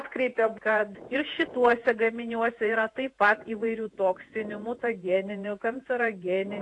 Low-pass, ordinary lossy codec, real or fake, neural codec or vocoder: 10.8 kHz; AAC, 48 kbps; fake; vocoder, 48 kHz, 128 mel bands, Vocos